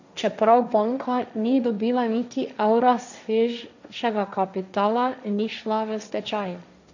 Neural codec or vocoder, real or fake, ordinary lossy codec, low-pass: codec, 16 kHz, 1.1 kbps, Voila-Tokenizer; fake; none; 7.2 kHz